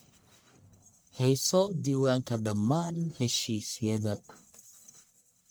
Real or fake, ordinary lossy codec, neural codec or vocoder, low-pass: fake; none; codec, 44.1 kHz, 1.7 kbps, Pupu-Codec; none